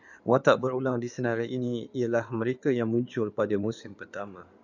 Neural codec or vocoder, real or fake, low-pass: codec, 16 kHz in and 24 kHz out, 2.2 kbps, FireRedTTS-2 codec; fake; 7.2 kHz